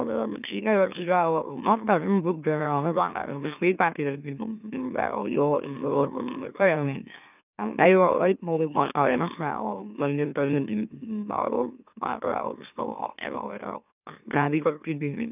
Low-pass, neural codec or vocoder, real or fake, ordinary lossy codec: 3.6 kHz; autoencoder, 44.1 kHz, a latent of 192 numbers a frame, MeloTTS; fake; none